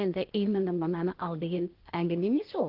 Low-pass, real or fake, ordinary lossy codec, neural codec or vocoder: 5.4 kHz; fake; Opus, 16 kbps; codec, 16 kHz, 0.8 kbps, ZipCodec